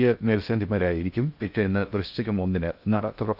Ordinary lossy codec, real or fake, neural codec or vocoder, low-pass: Opus, 64 kbps; fake; codec, 16 kHz in and 24 kHz out, 0.6 kbps, FocalCodec, streaming, 2048 codes; 5.4 kHz